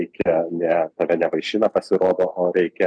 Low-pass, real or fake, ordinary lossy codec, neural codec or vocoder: 9.9 kHz; real; MP3, 96 kbps; none